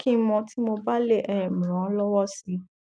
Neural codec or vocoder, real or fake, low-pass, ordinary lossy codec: none; real; 9.9 kHz; none